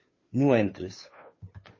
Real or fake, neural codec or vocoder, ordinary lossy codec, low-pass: fake; codec, 16 kHz, 2 kbps, FunCodec, trained on Chinese and English, 25 frames a second; MP3, 32 kbps; 7.2 kHz